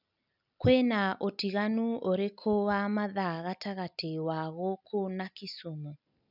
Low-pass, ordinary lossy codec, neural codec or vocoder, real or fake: 5.4 kHz; none; none; real